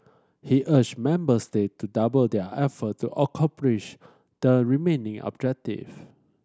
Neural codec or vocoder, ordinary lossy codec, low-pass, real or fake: none; none; none; real